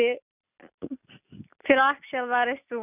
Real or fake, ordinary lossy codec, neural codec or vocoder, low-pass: real; none; none; 3.6 kHz